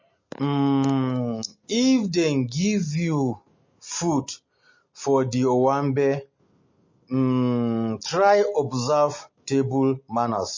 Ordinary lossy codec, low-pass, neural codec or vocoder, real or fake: MP3, 32 kbps; 7.2 kHz; none; real